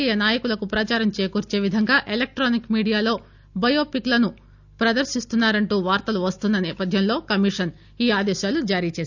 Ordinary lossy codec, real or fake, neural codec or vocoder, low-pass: none; real; none; 7.2 kHz